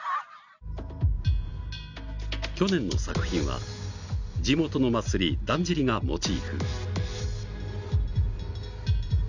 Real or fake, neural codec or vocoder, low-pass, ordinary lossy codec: real; none; 7.2 kHz; none